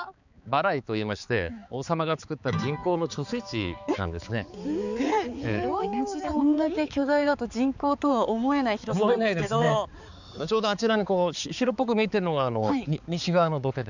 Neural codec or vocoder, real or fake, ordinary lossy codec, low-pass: codec, 16 kHz, 4 kbps, X-Codec, HuBERT features, trained on balanced general audio; fake; none; 7.2 kHz